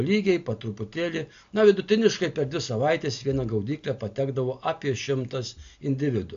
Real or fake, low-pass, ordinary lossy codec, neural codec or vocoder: real; 7.2 kHz; AAC, 64 kbps; none